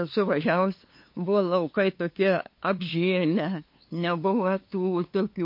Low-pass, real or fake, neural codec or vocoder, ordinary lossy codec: 5.4 kHz; fake; codec, 16 kHz, 2 kbps, FunCodec, trained on LibriTTS, 25 frames a second; MP3, 32 kbps